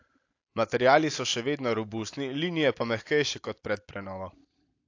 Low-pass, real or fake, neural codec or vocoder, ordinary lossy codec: 7.2 kHz; real; none; AAC, 48 kbps